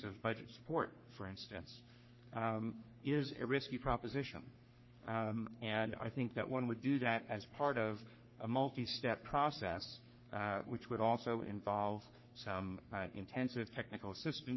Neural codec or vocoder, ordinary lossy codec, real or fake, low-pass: codec, 44.1 kHz, 3.4 kbps, Pupu-Codec; MP3, 24 kbps; fake; 7.2 kHz